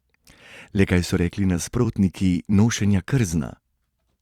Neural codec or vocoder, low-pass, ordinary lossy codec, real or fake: vocoder, 44.1 kHz, 128 mel bands every 256 samples, BigVGAN v2; 19.8 kHz; Opus, 64 kbps; fake